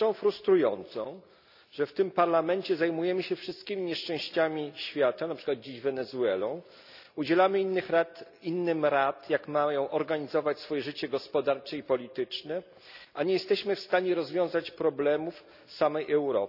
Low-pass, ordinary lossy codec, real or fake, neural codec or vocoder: 5.4 kHz; none; real; none